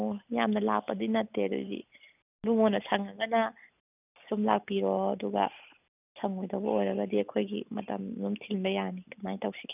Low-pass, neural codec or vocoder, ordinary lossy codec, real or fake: 3.6 kHz; none; none; real